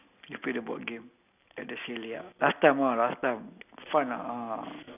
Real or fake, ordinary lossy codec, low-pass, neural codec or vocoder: real; none; 3.6 kHz; none